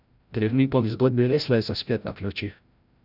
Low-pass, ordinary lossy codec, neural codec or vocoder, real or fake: 5.4 kHz; none; codec, 16 kHz, 0.5 kbps, FreqCodec, larger model; fake